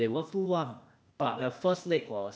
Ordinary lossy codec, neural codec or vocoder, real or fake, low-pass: none; codec, 16 kHz, 0.8 kbps, ZipCodec; fake; none